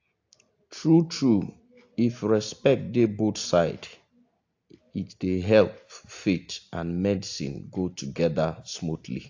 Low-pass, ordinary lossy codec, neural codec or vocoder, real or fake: 7.2 kHz; AAC, 48 kbps; none; real